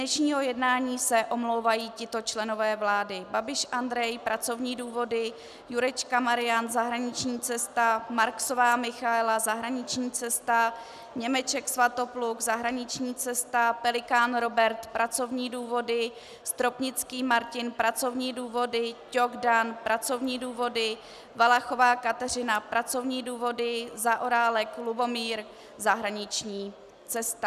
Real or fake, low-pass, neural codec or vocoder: real; 14.4 kHz; none